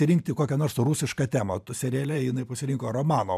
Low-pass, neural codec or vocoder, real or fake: 14.4 kHz; vocoder, 44.1 kHz, 128 mel bands every 512 samples, BigVGAN v2; fake